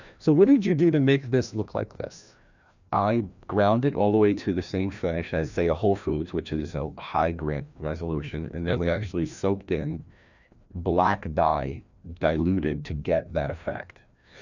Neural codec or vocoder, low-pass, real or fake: codec, 16 kHz, 1 kbps, FreqCodec, larger model; 7.2 kHz; fake